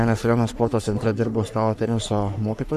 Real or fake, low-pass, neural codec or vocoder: fake; 14.4 kHz; codec, 44.1 kHz, 3.4 kbps, Pupu-Codec